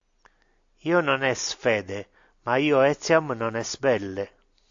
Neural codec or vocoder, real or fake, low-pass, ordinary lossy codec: none; real; 7.2 kHz; AAC, 64 kbps